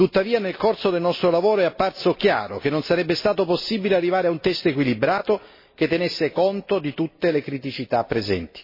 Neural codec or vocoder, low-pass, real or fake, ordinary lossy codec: none; 5.4 kHz; real; MP3, 24 kbps